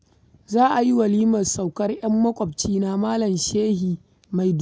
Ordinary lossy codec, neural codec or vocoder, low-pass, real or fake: none; none; none; real